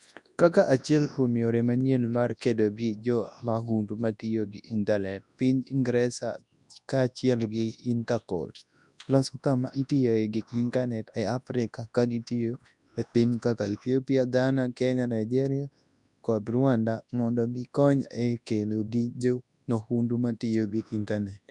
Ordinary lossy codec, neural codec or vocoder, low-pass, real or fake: none; codec, 24 kHz, 0.9 kbps, WavTokenizer, large speech release; 10.8 kHz; fake